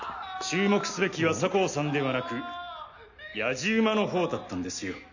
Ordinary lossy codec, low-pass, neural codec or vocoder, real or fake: none; 7.2 kHz; none; real